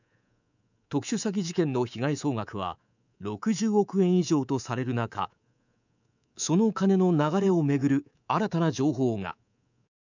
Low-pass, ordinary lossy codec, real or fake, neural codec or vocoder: 7.2 kHz; none; fake; codec, 24 kHz, 3.1 kbps, DualCodec